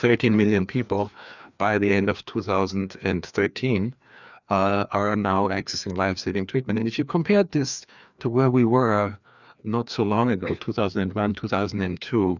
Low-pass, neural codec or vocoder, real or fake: 7.2 kHz; codec, 16 kHz, 2 kbps, FreqCodec, larger model; fake